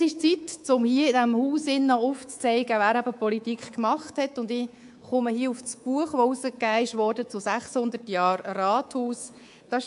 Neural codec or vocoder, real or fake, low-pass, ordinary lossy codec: codec, 24 kHz, 3.1 kbps, DualCodec; fake; 10.8 kHz; AAC, 64 kbps